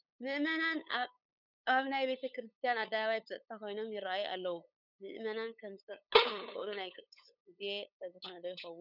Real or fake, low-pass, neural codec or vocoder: fake; 5.4 kHz; codec, 16 kHz, 8 kbps, FunCodec, trained on LibriTTS, 25 frames a second